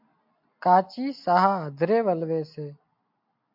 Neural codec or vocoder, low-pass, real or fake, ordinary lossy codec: none; 5.4 kHz; real; MP3, 48 kbps